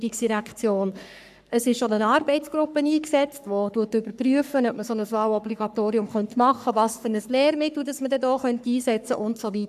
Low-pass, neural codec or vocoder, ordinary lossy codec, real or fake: 14.4 kHz; codec, 44.1 kHz, 3.4 kbps, Pupu-Codec; none; fake